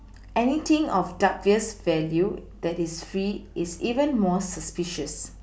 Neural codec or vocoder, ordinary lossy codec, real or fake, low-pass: none; none; real; none